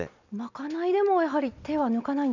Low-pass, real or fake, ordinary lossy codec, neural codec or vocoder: 7.2 kHz; real; none; none